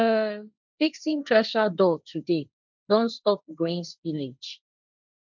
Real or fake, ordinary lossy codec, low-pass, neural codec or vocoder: fake; none; 7.2 kHz; codec, 16 kHz, 1.1 kbps, Voila-Tokenizer